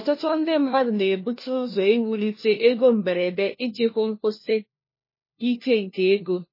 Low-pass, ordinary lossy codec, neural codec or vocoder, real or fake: 5.4 kHz; MP3, 24 kbps; codec, 16 kHz, 1 kbps, FunCodec, trained on LibriTTS, 50 frames a second; fake